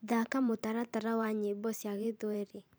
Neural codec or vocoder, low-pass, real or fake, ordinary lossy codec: vocoder, 44.1 kHz, 128 mel bands every 512 samples, BigVGAN v2; none; fake; none